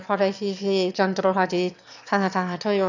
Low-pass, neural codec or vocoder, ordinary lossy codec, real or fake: 7.2 kHz; autoencoder, 22.05 kHz, a latent of 192 numbers a frame, VITS, trained on one speaker; none; fake